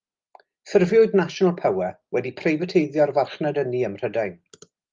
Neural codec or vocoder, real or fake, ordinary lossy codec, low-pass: none; real; Opus, 32 kbps; 7.2 kHz